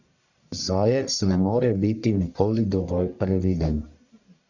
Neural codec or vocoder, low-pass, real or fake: codec, 44.1 kHz, 1.7 kbps, Pupu-Codec; 7.2 kHz; fake